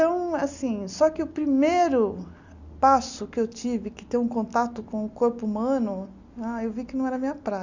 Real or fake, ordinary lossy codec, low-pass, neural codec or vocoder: real; none; 7.2 kHz; none